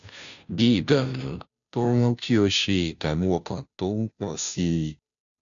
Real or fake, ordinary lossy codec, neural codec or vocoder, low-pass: fake; none; codec, 16 kHz, 0.5 kbps, FunCodec, trained on Chinese and English, 25 frames a second; 7.2 kHz